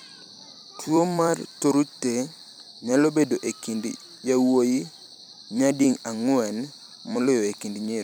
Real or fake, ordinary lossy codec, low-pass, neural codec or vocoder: fake; none; none; vocoder, 44.1 kHz, 128 mel bands every 256 samples, BigVGAN v2